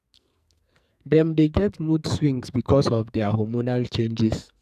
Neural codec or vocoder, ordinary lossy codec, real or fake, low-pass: codec, 44.1 kHz, 2.6 kbps, SNAC; none; fake; 14.4 kHz